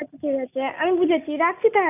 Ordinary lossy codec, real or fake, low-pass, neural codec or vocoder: none; real; 3.6 kHz; none